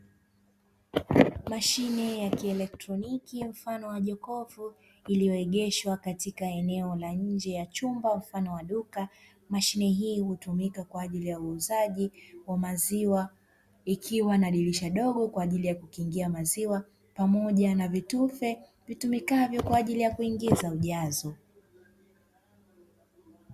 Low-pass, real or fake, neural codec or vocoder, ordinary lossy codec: 14.4 kHz; real; none; Opus, 64 kbps